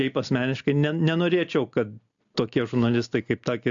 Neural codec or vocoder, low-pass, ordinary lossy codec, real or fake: none; 7.2 kHz; AAC, 64 kbps; real